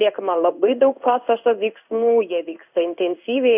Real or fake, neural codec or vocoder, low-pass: fake; codec, 16 kHz in and 24 kHz out, 1 kbps, XY-Tokenizer; 3.6 kHz